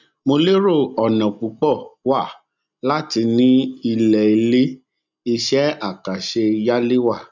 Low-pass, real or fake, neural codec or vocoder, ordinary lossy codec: 7.2 kHz; real; none; none